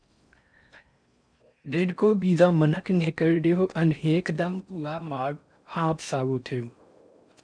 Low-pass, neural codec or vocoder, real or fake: 9.9 kHz; codec, 16 kHz in and 24 kHz out, 0.6 kbps, FocalCodec, streaming, 4096 codes; fake